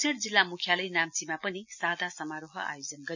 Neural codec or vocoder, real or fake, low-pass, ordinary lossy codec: none; real; 7.2 kHz; none